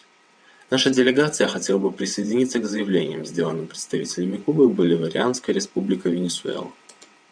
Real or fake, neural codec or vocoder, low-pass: fake; vocoder, 22.05 kHz, 80 mel bands, WaveNeXt; 9.9 kHz